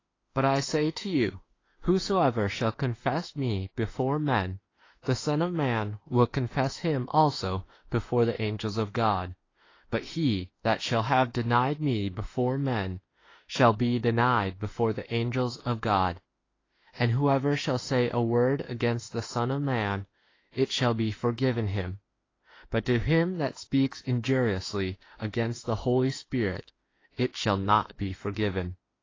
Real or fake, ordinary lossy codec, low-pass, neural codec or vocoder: fake; AAC, 32 kbps; 7.2 kHz; autoencoder, 48 kHz, 32 numbers a frame, DAC-VAE, trained on Japanese speech